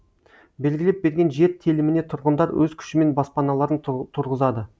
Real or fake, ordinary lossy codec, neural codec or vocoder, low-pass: real; none; none; none